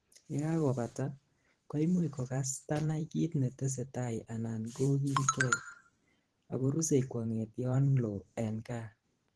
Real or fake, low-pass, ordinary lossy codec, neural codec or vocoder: fake; 10.8 kHz; Opus, 16 kbps; vocoder, 44.1 kHz, 128 mel bands every 512 samples, BigVGAN v2